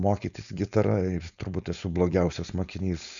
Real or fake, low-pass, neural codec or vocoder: fake; 7.2 kHz; codec, 16 kHz, 4.8 kbps, FACodec